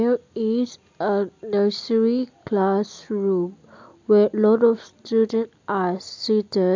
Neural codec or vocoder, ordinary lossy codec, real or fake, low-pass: none; MP3, 64 kbps; real; 7.2 kHz